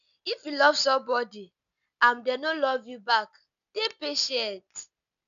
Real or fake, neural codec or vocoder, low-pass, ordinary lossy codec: real; none; 7.2 kHz; none